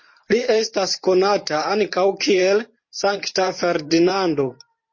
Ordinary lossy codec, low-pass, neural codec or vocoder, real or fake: MP3, 32 kbps; 7.2 kHz; vocoder, 44.1 kHz, 128 mel bands every 512 samples, BigVGAN v2; fake